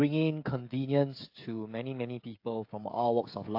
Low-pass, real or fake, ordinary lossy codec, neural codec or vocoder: 5.4 kHz; fake; AAC, 32 kbps; codec, 16 kHz, 16 kbps, FreqCodec, smaller model